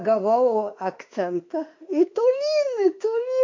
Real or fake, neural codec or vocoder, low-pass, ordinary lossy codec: fake; autoencoder, 48 kHz, 32 numbers a frame, DAC-VAE, trained on Japanese speech; 7.2 kHz; MP3, 48 kbps